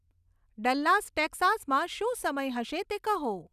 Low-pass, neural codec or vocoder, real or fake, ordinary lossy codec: 14.4 kHz; none; real; none